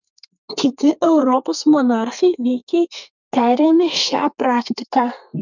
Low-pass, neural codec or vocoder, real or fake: 7.2 kHz; codec, 32 kHz, 1.9 kbps, SNAC; fake